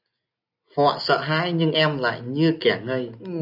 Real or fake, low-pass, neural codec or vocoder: real; 5.4 kHz; none